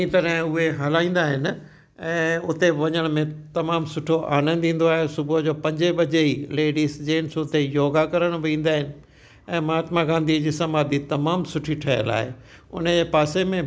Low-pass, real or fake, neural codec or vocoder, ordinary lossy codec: none; real; none; none